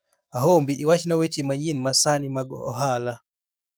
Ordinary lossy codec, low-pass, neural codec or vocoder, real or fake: none; none; codec, 44.1 kHz, 7.8 kbps, DAC; fake